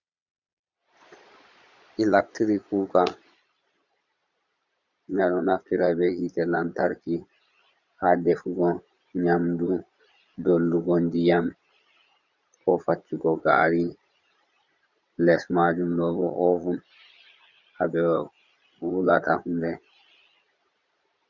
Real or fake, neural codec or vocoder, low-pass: fake; vocoder, 22.05 kHz, 80 mel bands, Vocos; 7.2 kHz